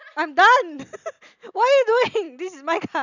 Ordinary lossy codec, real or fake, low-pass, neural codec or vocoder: none; real; 7.2 kHz; none